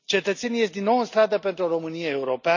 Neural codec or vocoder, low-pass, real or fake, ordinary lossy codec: none; 7.2 kHz; real; none